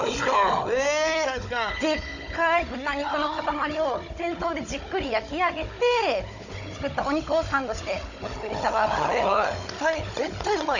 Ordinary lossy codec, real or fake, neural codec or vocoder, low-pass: none; fake; codec, 16 kHz, 16 kbps, FunCodec, trained on Chinese and English, 50 frames a second; 7.2 kHz